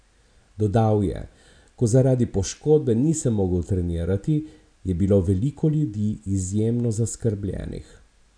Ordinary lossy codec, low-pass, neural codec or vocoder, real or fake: none; 9.9 kHz; none; real